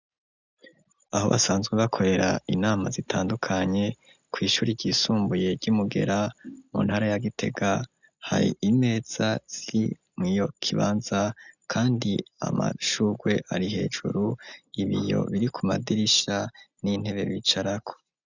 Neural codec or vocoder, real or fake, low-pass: none; real; 7.2 kHz